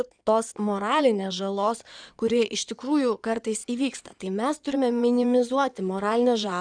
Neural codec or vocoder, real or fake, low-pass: codec, 16 kHz in and 24 kHz out, 2.2 kbps, FireRedTTS-2 codec; fake; 9.9 kHz